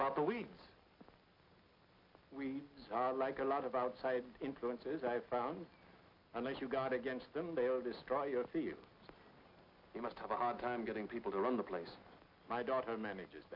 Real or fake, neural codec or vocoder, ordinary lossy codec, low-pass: real; none; MP3, 48 kbps; 5.4 kHz